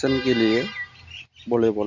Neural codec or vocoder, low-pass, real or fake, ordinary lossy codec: none; 7.2 kHz; real; Opus, 64 kbps